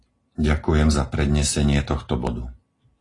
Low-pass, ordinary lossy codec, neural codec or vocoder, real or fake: 10.8 kHz; AAC, 32 kbps; none; real